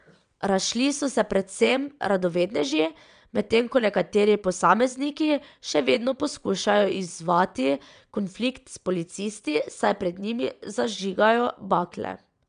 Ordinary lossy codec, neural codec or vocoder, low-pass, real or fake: none; vocoder, 22.05 kHz, 80 mel bands, WaveNeXt; 9.9 kHz; fake